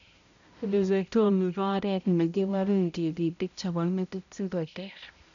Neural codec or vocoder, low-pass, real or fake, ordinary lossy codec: codec, 16 kHz, 0.5 kbps, X-Codec, HuBERT features, trained on balanced general audio; 7.2 kHz; fake; none